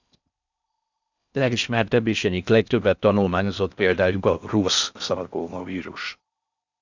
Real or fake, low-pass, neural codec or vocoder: fake; 7.2 kHz; codec, 16 kHz in and 24 kHz out, 0.6 kbps, FocalCodec, streaming, 4096 codes